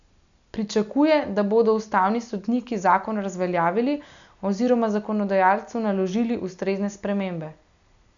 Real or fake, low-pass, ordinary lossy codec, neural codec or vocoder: real; 7.2 kHz; none; none